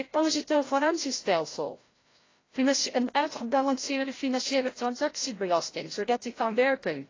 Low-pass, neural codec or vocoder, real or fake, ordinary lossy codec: 7.2 kHz; codec, 16 kHz, 0.5 kbps, FreqCodec, larger model; fake; AAC, 32 kbps